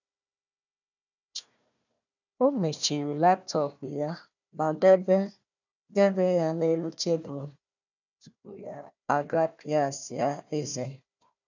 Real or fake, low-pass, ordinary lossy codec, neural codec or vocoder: fake; 7.2 kHz; none; codec, 16 kHz, 1 kbps, FunCodec, trained on Chinese and English, 50 frames a second